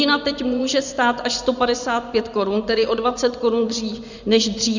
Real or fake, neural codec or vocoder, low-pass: fake; vocoder, 24 kHz, 100 mel bands, Vocos; 7.2 kHz